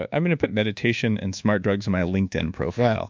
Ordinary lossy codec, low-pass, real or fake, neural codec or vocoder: MP3, 64 kbps; 7.2 kHz; fake; codec, 24 kHz, 1.2 kbps, DualCodec